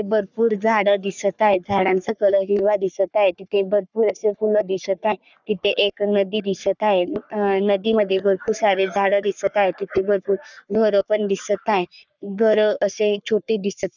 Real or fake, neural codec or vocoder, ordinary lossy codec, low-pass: fake; codec, 44.1 kHz, 3.4 kbps, Pupu-Codec; none; 7.2 kHz